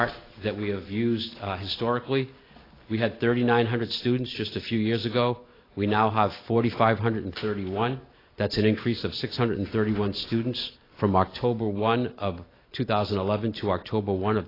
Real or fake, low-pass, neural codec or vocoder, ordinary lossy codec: real; 5.4 kHz; none; AAC, 24 kbps